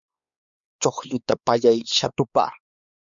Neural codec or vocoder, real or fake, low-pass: codec, 16 kHz, 4 kbps, X-Codec, WavLM features, trained on Multilingual LibriSpeech; fake; 7.2 kHz